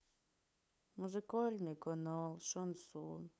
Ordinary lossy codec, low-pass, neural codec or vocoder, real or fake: none; none; codec, 16 kHz, 8 kbps, FunCodec, trained on LibriTTS, 25 frames a second; fake